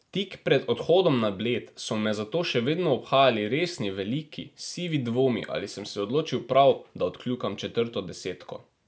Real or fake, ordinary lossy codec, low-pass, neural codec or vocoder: real; none; none; none